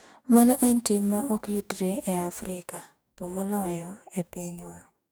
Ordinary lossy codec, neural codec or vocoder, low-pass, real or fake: none; codec, 44.1 kHz, 2.6 kbps, DAC; none; fake